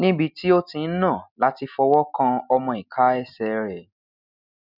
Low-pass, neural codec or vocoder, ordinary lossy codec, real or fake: 5.4 kHz; none; none; real